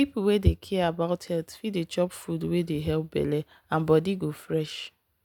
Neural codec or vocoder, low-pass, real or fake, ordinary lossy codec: none; 19.8 kHz; real; none